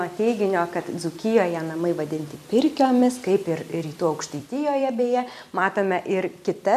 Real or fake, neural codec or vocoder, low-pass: fake; vocoder, 44.1 kHz, 128 mel bands every 256 samples, BigVGAN v2; 14.4 kHz